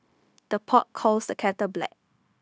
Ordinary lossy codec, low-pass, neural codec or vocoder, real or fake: none; none; codec, 16 kHz, 0.9 kbps, LongCat-Audio-Codec; fake